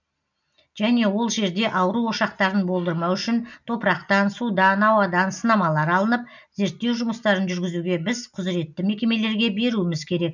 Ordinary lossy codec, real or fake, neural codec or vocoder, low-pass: none; real; none; 7.2 kHz